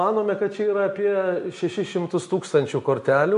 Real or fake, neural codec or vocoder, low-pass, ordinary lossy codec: real; none; 10.8 kHz; MP3, 48 kbps